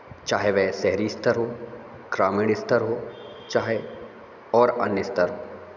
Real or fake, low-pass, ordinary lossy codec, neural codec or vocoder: real; 7.2 kHz; none; none